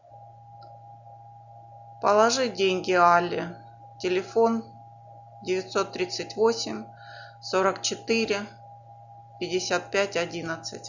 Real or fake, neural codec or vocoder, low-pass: real; none; 7.2 kHz